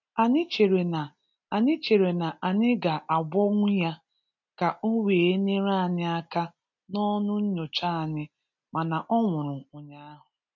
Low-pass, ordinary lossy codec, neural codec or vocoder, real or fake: 7.2 kHz; none; none; real